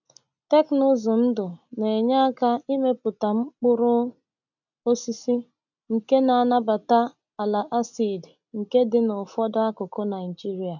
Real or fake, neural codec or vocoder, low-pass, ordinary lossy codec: real; none; 7.2 kHz; none